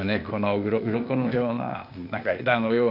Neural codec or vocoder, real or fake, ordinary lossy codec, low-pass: codec, 16 kHz, 0.8 kbps, ZipCodec; fake; none; 5.4 kHz